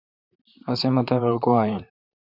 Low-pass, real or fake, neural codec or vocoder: 5.4 kHz; fake; vocoder, 44.1 kHz, 128 mel bands, Pupu-Vocoder